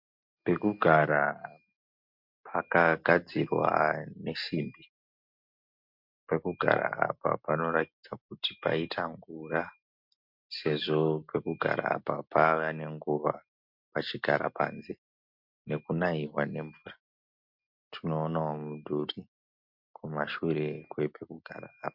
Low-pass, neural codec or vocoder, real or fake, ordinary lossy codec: 5.4 kHz; none; real; MP3, 48 kbps